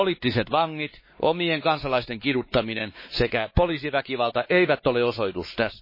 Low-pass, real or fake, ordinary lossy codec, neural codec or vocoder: 5.4 kHz; fake; MP3, 24 kbps; codec, 16 kHz, 4 kbps, X-Codec, WavLM features, trained on Multilingual LibriSpeech